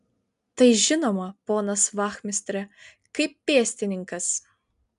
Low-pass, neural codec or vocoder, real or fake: 10.8 kHz; none; real